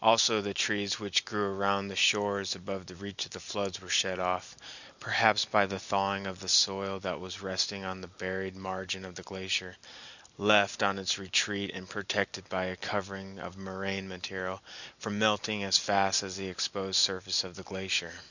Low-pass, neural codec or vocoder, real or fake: 7.2 kHz; none; real